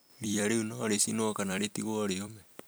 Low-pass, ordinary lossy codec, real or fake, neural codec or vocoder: none; none; fake; vocoder, 44.1 kHz, 128 mel bands every 256 samples, BigVGAN v2